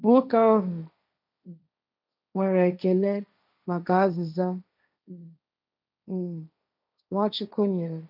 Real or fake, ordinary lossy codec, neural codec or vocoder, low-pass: fake; none; codec, 16 kHz, 1.1 kbps, Voila-Tokenizer; 5.4 kHz